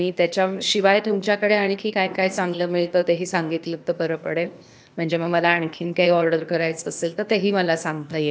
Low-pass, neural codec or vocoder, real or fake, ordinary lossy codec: none; codec, 16 kHz, 0.8 kbps, ZipCodec; fake; none